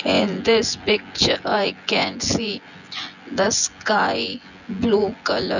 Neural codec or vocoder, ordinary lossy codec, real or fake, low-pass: vocoder, 24 kHz, 100 mel bands, Vocos; none; fake; 7.2 kHz